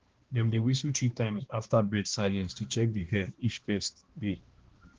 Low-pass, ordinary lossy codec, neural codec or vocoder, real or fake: 7.2 kHz; Opus, 16 kbps; codec, 16 kHz, 1 kbps, X-Codec, HuBERT features, trained on balanced general audio; fake